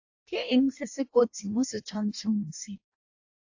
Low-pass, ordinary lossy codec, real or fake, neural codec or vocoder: 7.2 kHz; AAC, 48 kbps; fake; codec, 16 kHz in and 24 kHz out, 0.6 kbps, FireRedTTS-2 codec